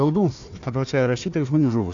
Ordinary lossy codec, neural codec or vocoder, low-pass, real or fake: AAC, 64 kbps; codec, 16 kHz, 1 kbps, FunCodec, trained on Chinese and English, 50 frames a second; 7.2 kHz; fake